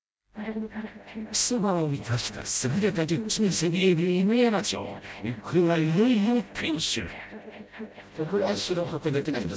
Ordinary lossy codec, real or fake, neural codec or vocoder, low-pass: none; fake; codec, 16 kHz, 0.5 kbps, FreqCodec, smaller model; none